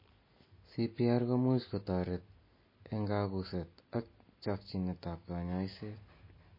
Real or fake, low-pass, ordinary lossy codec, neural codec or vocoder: real; 5.4 kHz; MP3, 24 kbps; none